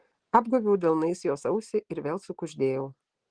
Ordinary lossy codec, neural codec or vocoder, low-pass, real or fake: Opus, 16 kbps; none; 9.9 kHz; real